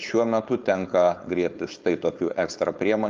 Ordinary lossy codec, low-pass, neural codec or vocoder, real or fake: Opus, 24 kbps; 7.2 kHz; codec, 16 kHz, 4.8 kbps, FACodec; fake